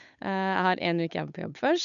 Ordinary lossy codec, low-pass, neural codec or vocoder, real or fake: MP3, 96 kbps; 7.2 kHz; codec, 16 kHz, 8 kbps, FunCodec, trained on Chinese and English, 25 frames a second; fake